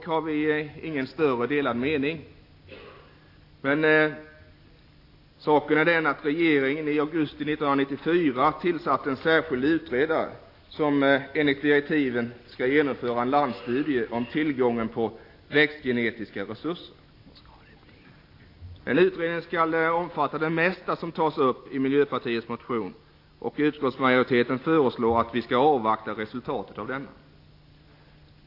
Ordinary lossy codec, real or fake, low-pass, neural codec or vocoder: AAC, 32 kbps; real; 5.4 kHz; none